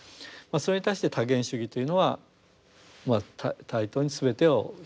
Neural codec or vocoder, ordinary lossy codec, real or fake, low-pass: none; none; real; none